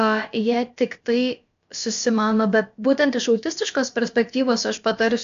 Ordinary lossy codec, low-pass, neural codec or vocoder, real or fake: AAC, 64 kbps; 7.2 kHz; codec, 16 kHz, about 1 kbps, DyCAST, with the encoder's durations; fake